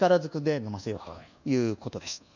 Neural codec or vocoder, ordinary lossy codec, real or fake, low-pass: codec, 24 kHz, 1.2 kbps, DualCodec; none; fake; 7.2 kHz